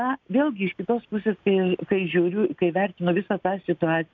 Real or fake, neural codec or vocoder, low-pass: real; none; 7.2 kHz